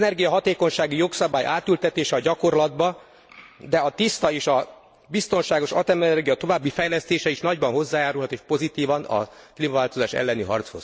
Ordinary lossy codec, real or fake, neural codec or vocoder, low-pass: none; real; none; none